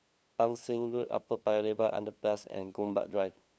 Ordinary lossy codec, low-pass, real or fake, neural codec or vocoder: none; none; fake; codec, 16 kHz, 2 kbps, FunCodec, trained on LibriTTS, 25 frames a second